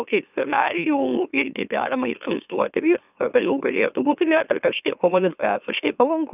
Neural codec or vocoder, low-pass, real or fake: autoencoder, 44.1 kHz, a latent of 192 numbers a frame, MeloTTS; 3.6 kHz; fake